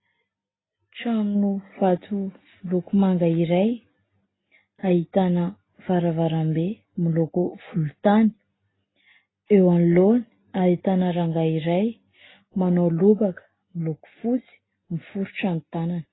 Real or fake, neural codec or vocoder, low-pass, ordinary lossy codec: real; none; 7.2 kHz; AAC, 16 kbps